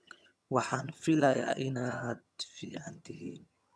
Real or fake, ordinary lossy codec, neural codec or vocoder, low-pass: fake; none; vocoder, 22.05 kHz, 80 mel bands, HiFi-GAN; none